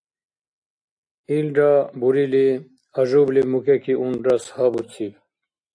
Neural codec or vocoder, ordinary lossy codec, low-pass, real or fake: none; AAC, 64 kbps; 9.9 kHz; real